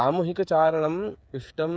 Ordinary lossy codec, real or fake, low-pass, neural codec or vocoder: none; fake; none; codec, 16 kHz, 8 kbps, FreqCodec, smaller model